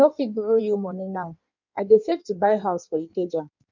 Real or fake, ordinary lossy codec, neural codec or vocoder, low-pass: fake; none; codec, 16 kHz in and 24 kHz out, 1.1 kbps, FireRedTTS-2 codec; 7.2 kHz